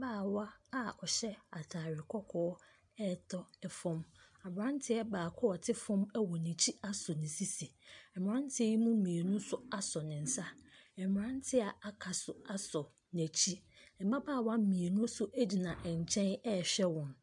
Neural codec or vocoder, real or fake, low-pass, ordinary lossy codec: none; real; 10.8 kHz; MP3, 96 kbps